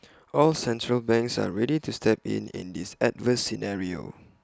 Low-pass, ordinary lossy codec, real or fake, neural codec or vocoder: none; none; real; none